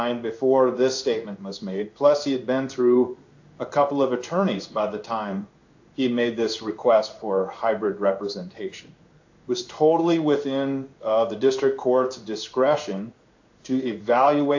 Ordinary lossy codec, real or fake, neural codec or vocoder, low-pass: AAC, 48 kbps; fake; codec, 16 kHz in and 24 kHz out, 1 kbps, XY-Tokenizer; 7.2 kHz